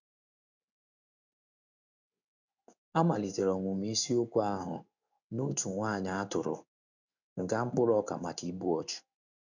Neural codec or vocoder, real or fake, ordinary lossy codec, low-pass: codec, 16 kHz in and 24 kHz out, 1 kbps, XY-Tokenizer; fake; none; 7.2 kHz